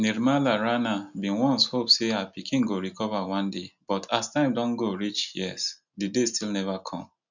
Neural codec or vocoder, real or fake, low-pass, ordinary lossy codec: none; real; 7.2 kHz; none